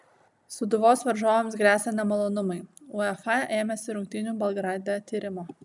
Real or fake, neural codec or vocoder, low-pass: real; none; 10.8 kHz